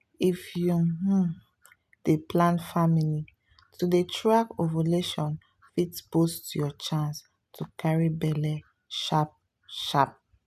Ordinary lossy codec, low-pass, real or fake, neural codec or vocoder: none; 14.4 kHz; real; none